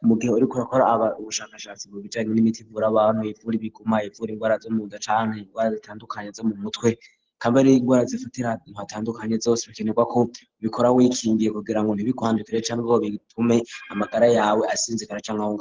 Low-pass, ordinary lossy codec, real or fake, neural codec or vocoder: 7.2 kHz; Opus, 16 kbps; real; none